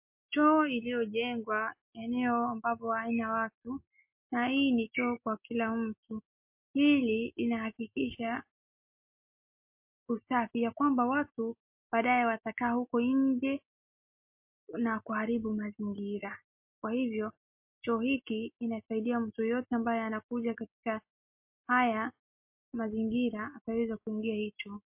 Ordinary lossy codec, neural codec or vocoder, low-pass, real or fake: MP3, 32 kbps; none; 3.6 kHz; real